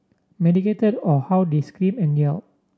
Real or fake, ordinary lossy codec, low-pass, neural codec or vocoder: real; none; none; none